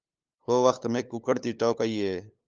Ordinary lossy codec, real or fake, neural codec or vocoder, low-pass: Opus, 24 kbps; fake; codec, 16 kHz, 8 kbps, FunCodec, trained on LibriTTS, 25 frames a second; 7.2 kHz